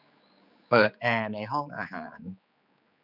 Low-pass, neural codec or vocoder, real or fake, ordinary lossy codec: 5.4 kHz; codec, 16 kHz, 4 kbps, X-Codec, HuBERT features, trained on general audio; fake; none